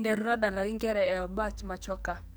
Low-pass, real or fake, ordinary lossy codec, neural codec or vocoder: none; fake; none; codec, 44.1 kHz, 2.6 kbps, SNAC